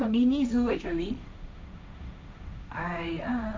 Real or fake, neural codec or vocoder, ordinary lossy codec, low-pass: fake; codec, 16 kHz, 1.1 kbps, Voila-Tokenizer; none; none